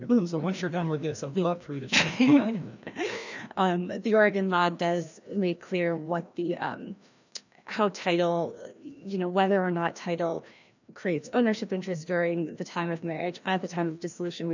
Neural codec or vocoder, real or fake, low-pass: codec, 16 kHz, 1 kbps, FreqCodec, larger model; fake; 7.2 kHz